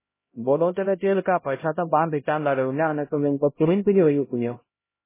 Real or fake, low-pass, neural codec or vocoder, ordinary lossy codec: fake; 3.6 kHz; codec, 16 kHz, 0.5 kbps, X-Codec, HuBERT features, trained on LibriSpeech; MP3, 16 kbps